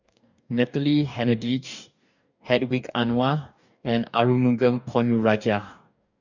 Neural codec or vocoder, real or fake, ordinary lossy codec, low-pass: codec, 44.1 kHz, 2.6 kbps, DAC; fake; none; 7.2 kHz